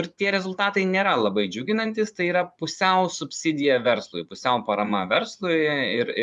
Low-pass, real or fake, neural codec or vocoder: 10.8 kHz; fake; vocoder, 24 kHz, 100 mel bands, Vocos